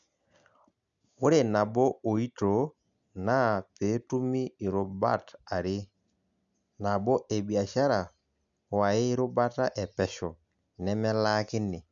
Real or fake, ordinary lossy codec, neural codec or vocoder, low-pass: real; none; none; 7.2 kHz